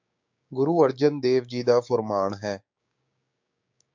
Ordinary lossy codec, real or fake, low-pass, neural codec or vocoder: MP3, 64 kbps; fake; 7.2 kHz; codec, 16 kHz, 6 kbps, DAC